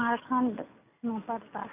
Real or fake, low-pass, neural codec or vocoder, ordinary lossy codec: real; 3.6 kHz; none; none